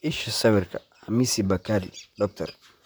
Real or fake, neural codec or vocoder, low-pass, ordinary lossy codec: fake; vocoder, 44.1 kHz, 128 mel bands, Pupu-Vocoder; none; none